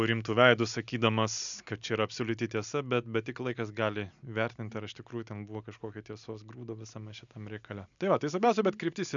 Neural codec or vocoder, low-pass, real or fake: none; 7.2 kHz; real